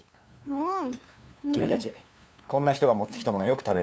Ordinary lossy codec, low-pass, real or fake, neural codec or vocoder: none; none; fake; codec, 16 kHz, 2 kbps, FunCodec, trained on LibriTTS, 25 frames a second